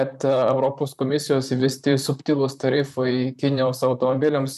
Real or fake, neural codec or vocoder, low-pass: fake; vocoder, 44.1 kHz, 128 mel bands, Pupu-Vocoder; 14.4 kHz